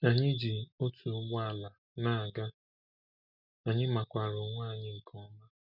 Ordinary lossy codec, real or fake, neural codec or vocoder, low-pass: none; real; none; 5.4 kHz